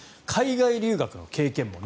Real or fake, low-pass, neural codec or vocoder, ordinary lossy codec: real; none; none; none